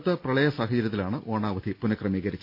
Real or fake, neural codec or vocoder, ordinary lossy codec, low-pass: real; none; none; 5.4 kHz